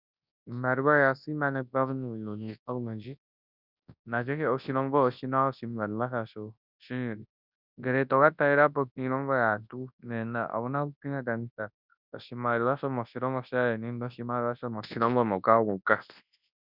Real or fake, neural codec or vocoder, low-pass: fake; codec, 24 kHz, 0.9 kbps, WavTokenizer, large speech release; 5.4 kHz